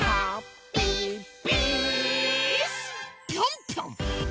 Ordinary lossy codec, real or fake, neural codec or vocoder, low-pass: none; real; none; none